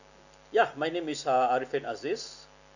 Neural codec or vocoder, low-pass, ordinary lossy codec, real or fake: none; 7.2 kHz; none; real